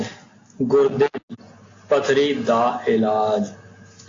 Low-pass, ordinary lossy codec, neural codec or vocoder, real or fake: 7.2 kHz; AAC, 48 kbps; none; real